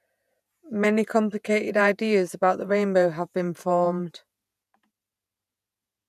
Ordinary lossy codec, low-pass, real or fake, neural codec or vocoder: none; 14.4 kHz; fake; vocoder, 48 kHz, 128 mel bands, Vocos